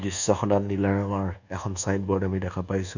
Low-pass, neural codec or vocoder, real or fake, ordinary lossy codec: 7.2 kHz; codec, 24 kHz, 1.2 kbps, DualCodec; fake; none